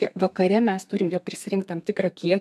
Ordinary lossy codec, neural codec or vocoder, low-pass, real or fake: MP3, 96 kbps; codec, 32 kHz, 1.9 kbps, SNAC; 14.4 kHz; fake